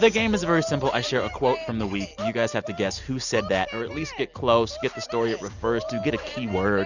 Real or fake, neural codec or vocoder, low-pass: real; none; 7.2 kHz